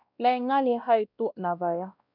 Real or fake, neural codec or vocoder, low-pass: fake; codec, 16 kHz, 1 kbps, X-Codec, WavLM features, trained on Multilingual LibriSpeech; 5.4 kHz